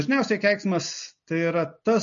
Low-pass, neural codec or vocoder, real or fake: 7.2 kHz; none; real